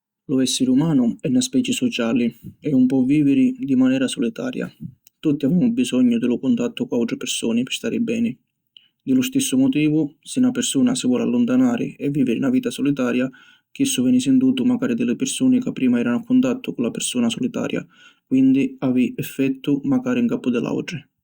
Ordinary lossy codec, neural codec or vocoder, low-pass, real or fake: Opus, 64 kbps; none; 19.8 kHz; real